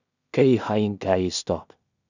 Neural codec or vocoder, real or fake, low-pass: codec, 16 kHz in and 24 kHz out, 0.4 kbps, LongCat-Audio-Codec, two codebook decoder; fake; 7.2 kHz